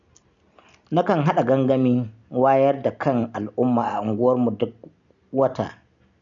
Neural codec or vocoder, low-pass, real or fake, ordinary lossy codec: none; 7.2 kHz; real; none